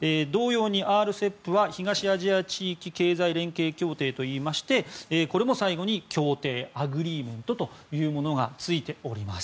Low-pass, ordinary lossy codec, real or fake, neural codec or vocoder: none; none; real; none